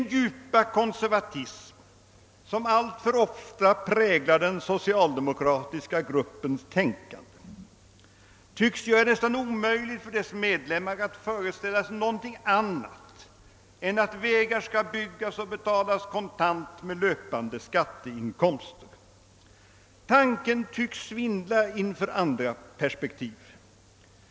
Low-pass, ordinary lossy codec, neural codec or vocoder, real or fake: none; none; none; real